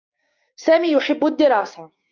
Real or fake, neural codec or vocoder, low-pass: fake; codec, 16 kHz, 6 kbps, DAC; 7.2 kHz